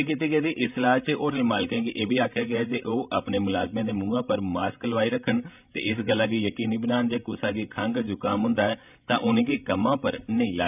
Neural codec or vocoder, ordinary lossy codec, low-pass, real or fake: codec, 16 kHz, 16 kbps, FreqCodec, larger model; none; 3.6 kHz; fake